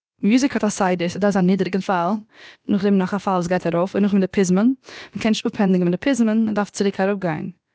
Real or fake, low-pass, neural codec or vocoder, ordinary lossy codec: fake; none; codec, 16 kHz, about 1 kbps, DyCAST, with the encoder's durations; none